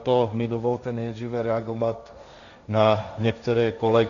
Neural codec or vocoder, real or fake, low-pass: codec, 16 kHz, 1.1 kbps, Voila-Tokenizer; fake; 7.2 kHz